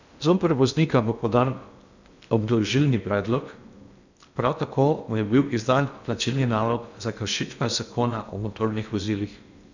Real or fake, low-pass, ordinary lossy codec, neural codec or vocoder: fake; 7.2 kHz; none; codec, 16 kHz in and 24 kHz out, 0.6 kbps, FocalCodec, streaming, 2048 codes